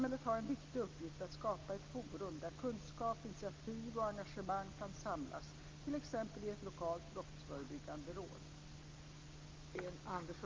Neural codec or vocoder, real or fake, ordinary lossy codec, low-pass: none; real; Opus, 24 kbps; 7.2 kHz